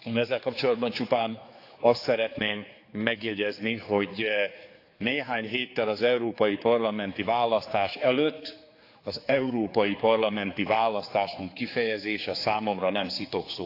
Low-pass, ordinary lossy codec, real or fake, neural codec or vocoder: 5.4 kHz; AAC, 32 kbps; fake; codec, 16 kHz, 4 kbps, X-Codec, HuBERT features, trained on general audio